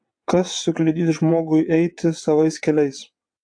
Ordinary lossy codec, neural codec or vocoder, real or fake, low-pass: AAC, 64 kbps; vocoder, 22.05 kHz, 80 mel bands, WaveNeXt; fake; 9.9 kHz